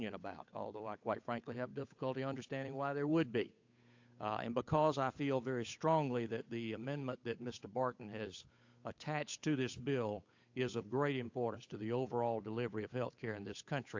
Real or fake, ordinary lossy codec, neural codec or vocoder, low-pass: fake; AAC, 48 kbps; codec, 16 kHz, 8 kbps, FunCodec, trained on Chinese and English, 25 frames a second; 7.2 kHz